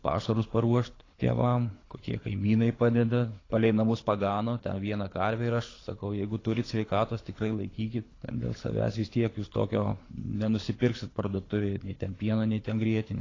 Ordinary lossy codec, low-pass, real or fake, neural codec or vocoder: AAC, 32 kbps; 7.2 kHz; fake; codec, 24 kHz, 6 kbps, HILCodec